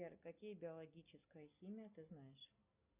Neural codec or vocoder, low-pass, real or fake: none; 3.6 kHz; real